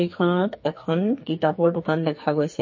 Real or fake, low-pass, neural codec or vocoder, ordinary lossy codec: fake; 7.2 kHz; codec, 44.1 kHz, 2.6 kbps, SNAC; MP3, 32 kbps